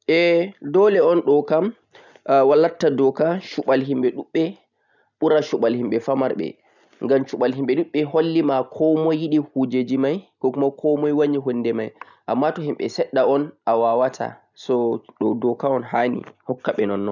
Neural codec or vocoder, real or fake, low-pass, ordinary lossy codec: none; real; 7.2 kHz; none